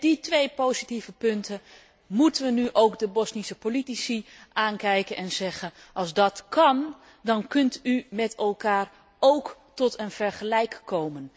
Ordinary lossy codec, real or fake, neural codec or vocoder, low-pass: none; real; none; none